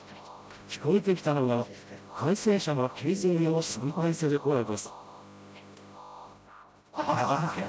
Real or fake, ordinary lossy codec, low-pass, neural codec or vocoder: fake; none; none; codec, 16 kHz, 0.5 kbps, FreqCodec, smaller model